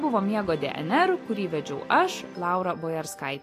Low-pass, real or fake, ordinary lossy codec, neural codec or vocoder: 14.4 kHz; real; AAC, 48 kbps; none